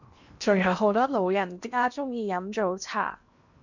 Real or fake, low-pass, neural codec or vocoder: fake; 7.2 kHz; codec, 16 kHz in and 24 kHz out, 0.8 kbps, FocalCodec, streaming, 65536 codes